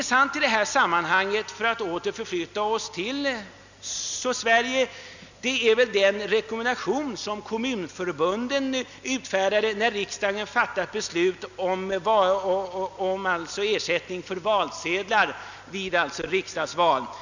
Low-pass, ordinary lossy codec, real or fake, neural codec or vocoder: 7.2 kHz; none; real; none